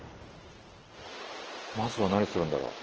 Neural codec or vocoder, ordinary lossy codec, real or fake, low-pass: none; Opus, 16 kbps; real; 7.2 kHz